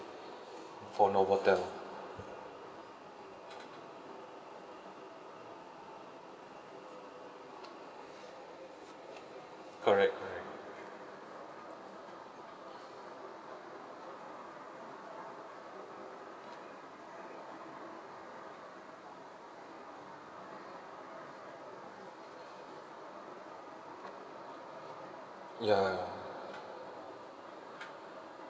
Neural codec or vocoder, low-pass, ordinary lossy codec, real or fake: none; none; none; real